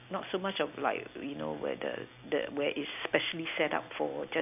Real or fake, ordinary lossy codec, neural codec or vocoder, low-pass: real; AAC, 32 kbps; none; 3.6 kHz